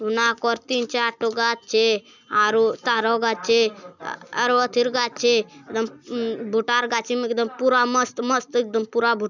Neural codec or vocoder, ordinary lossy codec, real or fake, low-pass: none; none; real; 7.2 kHz